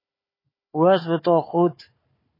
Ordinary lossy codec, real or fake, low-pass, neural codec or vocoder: MP3, 24 kbps; fake; 5.4 kHz; codec, 16 kHz, 4 kbps, FunCodec, trained on Chinese and English, 50 frames a second